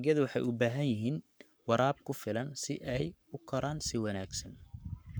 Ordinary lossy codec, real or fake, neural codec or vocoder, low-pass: none; fake; codec, 44.1 kHz, 7.8 kbps, Pupu-Codec; none